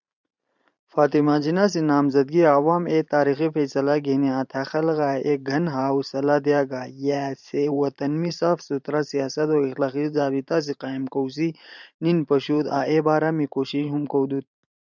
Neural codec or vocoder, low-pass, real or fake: vocoder, 44.1 kHz, 128 mel bands every 512 samples, BigVGAN v2; 7.2 kHz; fake